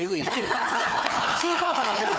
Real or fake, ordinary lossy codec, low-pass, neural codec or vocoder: fake; none; none; codec, 16 kHz, 4 kbps, FunCodec, trained on Chinese and English, 50 frames a second